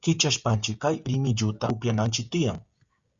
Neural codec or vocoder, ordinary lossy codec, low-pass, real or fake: codec, 16 kHz, 16 kbps, FreqCodec, smaller model; Opus, 64 kbps; 7.2 kHz; fake